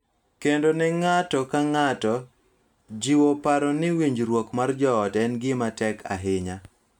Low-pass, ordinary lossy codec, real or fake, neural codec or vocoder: 19.8 kHz; none; real; none